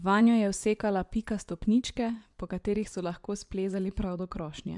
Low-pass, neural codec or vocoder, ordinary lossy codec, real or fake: 10.8 kHz; vocoder, 24 kHz, 100 mel bands, Vocos; MP3, 96 kbps; fake